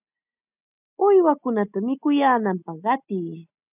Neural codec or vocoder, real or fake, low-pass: none; real; 3.6 kHz